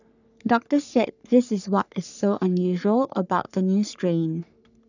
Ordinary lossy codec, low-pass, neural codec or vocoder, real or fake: none; 7.2 kHz; codec, 44.1 kHz, 3.4 kbps, Pupu-Codec; fake